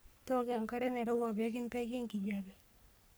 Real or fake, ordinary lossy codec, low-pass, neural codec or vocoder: fake; none; none; codec, 44.1 kHz, 3.4 kbps, Pupu-Codec